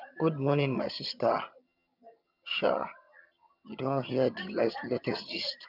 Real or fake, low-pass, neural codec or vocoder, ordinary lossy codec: fake; 5.4 kHz; vocoder, 22.05 kHz, 80 mel bands, HiFi-GAN; none